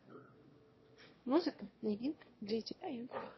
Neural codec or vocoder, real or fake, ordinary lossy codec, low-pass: autoencoder, 22.05 kHz, a latent of 192 numbers a frame, VITS, trained on one speaker; fake; MP3, 24 kbps; 7.2 kHz